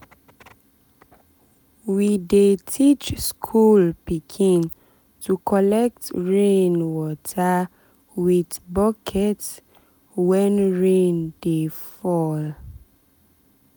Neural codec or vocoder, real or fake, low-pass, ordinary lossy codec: none; real; none; none